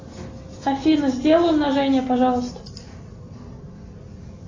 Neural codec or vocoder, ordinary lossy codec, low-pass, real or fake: none; AAC, 32 kbps; 7.2 kHz; real